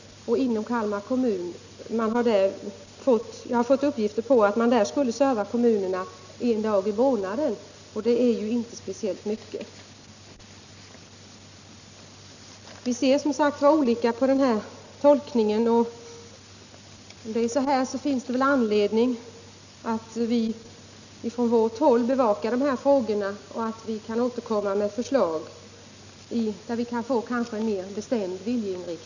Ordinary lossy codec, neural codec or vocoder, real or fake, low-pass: none; none; real; 7.2 kHz